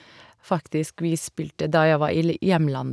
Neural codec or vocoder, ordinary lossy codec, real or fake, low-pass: none; none; real; none